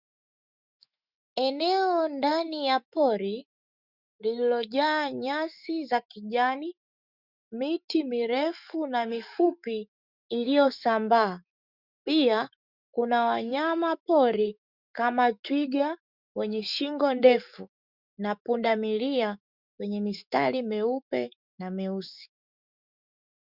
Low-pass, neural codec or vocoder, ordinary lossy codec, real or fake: 5.4 kHz; autoencoder, 48 kHz, 128 numbers a frame, DAC-VAE, trained on Japanese speech; Opus, 64 kbps; fake